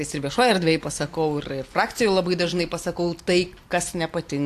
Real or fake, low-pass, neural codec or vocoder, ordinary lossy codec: real; 14.4 kHz; none; AAC, 64 kbps